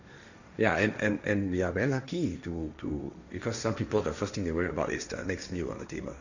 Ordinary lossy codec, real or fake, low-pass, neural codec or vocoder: none; fake; 7.2 kHz; codec, 16 kHz, 1.1 kbps, Voila-Tokenizer